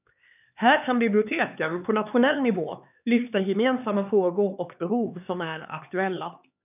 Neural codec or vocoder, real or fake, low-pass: codec, 16 kHz, 4 kbps, X-Codec, HuBERT features, trained on LibriSpeech; fake; 3.6 kHz